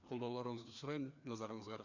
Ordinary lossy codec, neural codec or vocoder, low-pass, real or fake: none; codec, 16 kHz, 2 kbps, FreqCodec, larger model; 7.2 kHz; fake